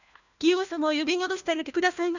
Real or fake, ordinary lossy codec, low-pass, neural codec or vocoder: fake; none; 7.2 kHz; codec, 16 kHz, 1 kbps, FunCodec, trained on LibriTTS, 50 frames a second